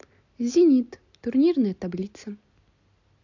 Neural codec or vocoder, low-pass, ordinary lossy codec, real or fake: none; 7.2 kHz; AAC, 48 kbps; real